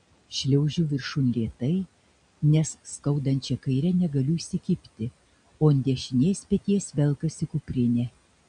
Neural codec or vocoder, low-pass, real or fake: none; 9.9 kHz; real